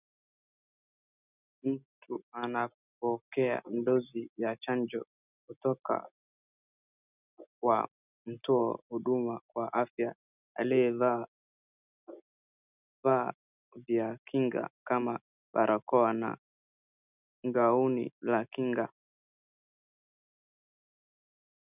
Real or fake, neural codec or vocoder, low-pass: real; none; 3.6 kHz